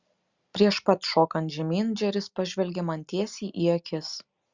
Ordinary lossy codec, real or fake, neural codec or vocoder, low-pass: Opus, 64 kbps; real; none; 7.2 kHz